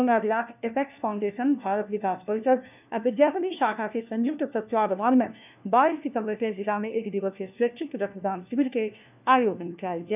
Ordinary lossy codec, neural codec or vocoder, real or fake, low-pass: none; codec, 16 kHz, 1 kbps, FunCodec, trained on LibriTTS, 50 frames a second; fake; 3.6 kHz